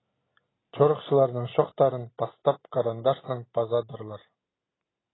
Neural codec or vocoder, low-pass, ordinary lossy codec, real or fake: none; 7.2 kHz; AAC, 16 kbps; real